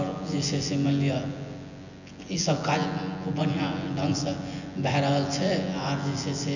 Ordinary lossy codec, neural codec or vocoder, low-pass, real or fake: none; vocoder, 24 kHz, 100 mel bands, Vocos; 7.2 kHz; fake